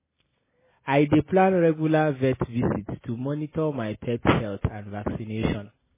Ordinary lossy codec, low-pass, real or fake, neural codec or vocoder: MP3, 16 kbps; 3.6 kHz; real; none